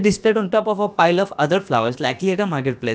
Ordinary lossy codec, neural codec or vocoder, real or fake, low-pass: none; codec, 16 kHz, about 1 kbps, DyCAST, with the encoder's durations; fake; none